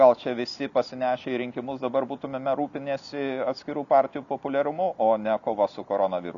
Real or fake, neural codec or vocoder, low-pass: real; none; 7.2 kHz